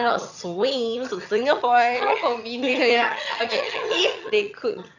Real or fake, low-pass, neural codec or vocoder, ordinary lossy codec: fake; 7.2 kHz; vocoder, 22.05 kHz, 80 mel bands, HiFi-GAN; none